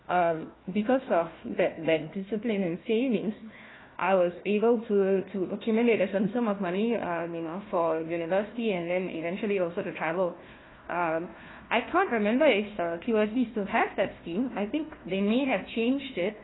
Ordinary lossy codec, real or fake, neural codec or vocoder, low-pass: AAC, 16 kbps; fake; codec, 16 kHz, 1 kbps, FunCodec, trained on Chinese and English, 50 frames a second; 7.2 kHz